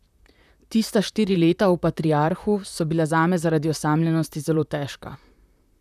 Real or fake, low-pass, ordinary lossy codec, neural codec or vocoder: fake; 14.4 kHz; none; vocoder, 44.1 kHz, 128 mel bands, Pupu-Vocoder